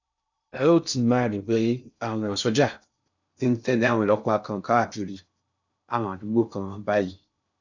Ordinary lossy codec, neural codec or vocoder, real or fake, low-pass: none; codec, 16 kHz in and 24 kHz out, 0.6 kbps, FocalCodec, streaming, 2048 codes; fake; 7.2 kHz